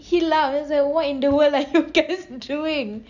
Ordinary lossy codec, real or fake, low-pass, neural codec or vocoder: none; real; 7.2 kHz; none